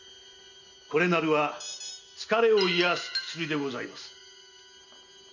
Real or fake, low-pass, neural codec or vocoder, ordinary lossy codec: real; 7.2 kHz; none; none